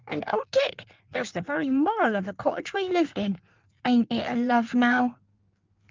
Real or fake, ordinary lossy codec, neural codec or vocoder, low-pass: fake; Opus, 24 kbps; codec, 16 kHz in and 24 kHz out, 1.1 kbps, FireRedTTS-2 codec; 7.2 kHz